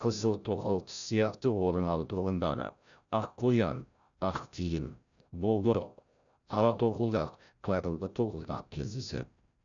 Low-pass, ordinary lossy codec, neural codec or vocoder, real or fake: 7.2 kHz; none; codec, 16 kHz, 0.5 kbps, FreqCodec, larger model; fake